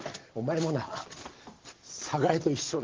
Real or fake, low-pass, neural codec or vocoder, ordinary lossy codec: real; 7.2 kHz; none; Opus, 16 kbps